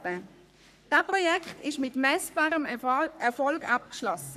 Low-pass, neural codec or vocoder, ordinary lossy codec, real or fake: 14.4 kHz; codec, 44.1 kHz, 3.4 kbps, Pupu-Codec; none; fake